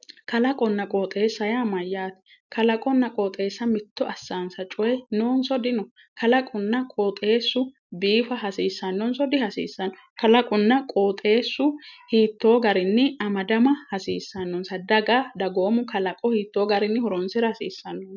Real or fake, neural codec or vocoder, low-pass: real; none; 7.2 kHz